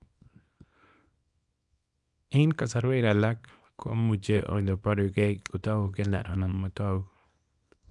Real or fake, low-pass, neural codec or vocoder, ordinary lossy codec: fake; 10.8 kHz; codec, 24 kHz, 0.9 kbps, WavTokenizer, small release; none